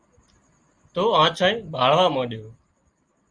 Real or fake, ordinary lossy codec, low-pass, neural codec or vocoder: real; Opus, 32 kbps; 9.9 kHz; none